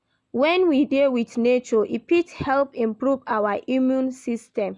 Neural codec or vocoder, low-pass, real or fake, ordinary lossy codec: none; 10.8 kHz; real; none